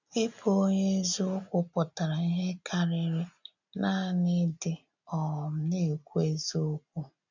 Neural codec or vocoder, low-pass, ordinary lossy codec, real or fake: none; 7.2 kHz; none; real